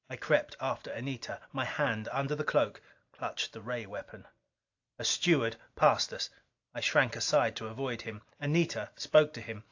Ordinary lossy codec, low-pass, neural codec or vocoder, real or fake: AAC, 48 kbps; 7.2 kHz; none; real